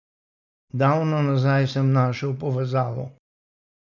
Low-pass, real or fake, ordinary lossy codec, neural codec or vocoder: 7.2 kHz; real; none; none